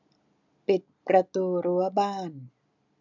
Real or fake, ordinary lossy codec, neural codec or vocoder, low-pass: real; none; none; 7.2 kHz